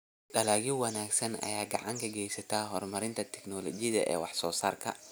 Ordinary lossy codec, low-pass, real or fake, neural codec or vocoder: none; none; real; none